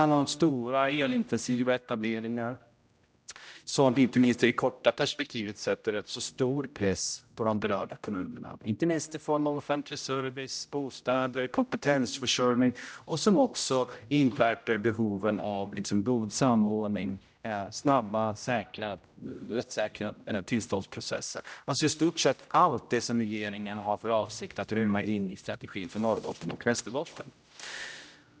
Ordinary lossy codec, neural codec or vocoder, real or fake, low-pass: none; codec, 16 kHz, 0.5 kbps, X-Codec, HuBERT features, trained on general audio; fake; none